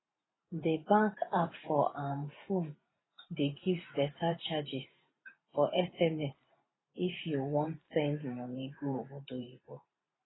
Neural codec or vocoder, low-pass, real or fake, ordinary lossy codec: vocoder, 44.1 kHz, 128 mel bands every 512 samples, BigVGAN v2; 7.2 kHz; fake; AAC, 16 kbps